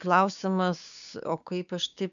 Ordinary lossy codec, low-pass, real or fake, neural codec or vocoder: MP3, 96 kbps; 7.2 kHz; fake; codec, 16 kHz, 6 kbps, DAC